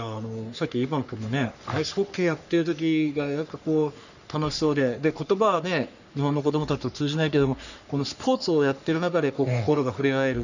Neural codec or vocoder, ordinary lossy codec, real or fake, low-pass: codec, 44.1 kHz, 3.4 kbps, Pupu-Codec; none; fake; 7.2 kHz